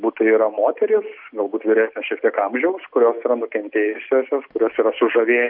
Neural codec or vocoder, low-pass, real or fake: none; 5.4 kHz; real